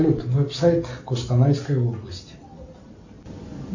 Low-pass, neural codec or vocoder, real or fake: 7.2 kHz; none; real